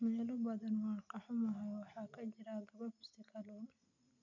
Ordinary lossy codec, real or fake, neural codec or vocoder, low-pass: none; real; none; 7.2 kHz